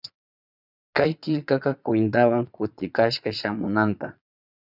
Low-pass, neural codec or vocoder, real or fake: 5.4 kHz; vocoder, 22.05 kHz, 80 mel bands, Vocos; fake